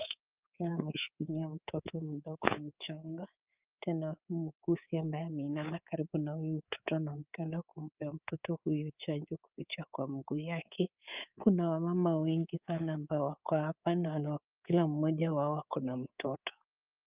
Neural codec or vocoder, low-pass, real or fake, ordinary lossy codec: codec, 24 kHz, 3.1 kbps, DualCodec; 3.6 kHz; fake; Opus, 24 kbps